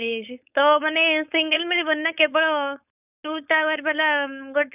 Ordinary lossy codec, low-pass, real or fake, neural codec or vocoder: none; 3.6 kHz; fake; codec, 16 kHz, 4.8 kbps, FACodec